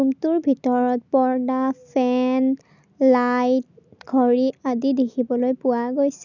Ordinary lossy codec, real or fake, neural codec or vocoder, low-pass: none; real; none; 7.2 kHz